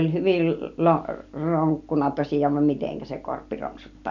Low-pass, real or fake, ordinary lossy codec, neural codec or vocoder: 7.2 kHz; real; none; none